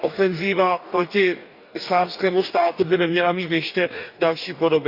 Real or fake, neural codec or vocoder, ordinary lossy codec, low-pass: fake; codec, 44.1 kHz, 2.6 kbps, DAC; none; 5.4 kHz